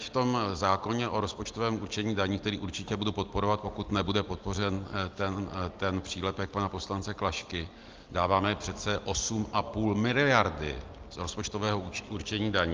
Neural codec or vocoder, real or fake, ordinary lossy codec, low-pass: none; real; Opus, 24 kbps; 7.2 kHz